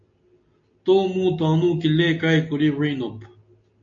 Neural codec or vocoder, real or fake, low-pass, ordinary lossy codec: none; real; 7.2 kHz; AAC, 48 kbps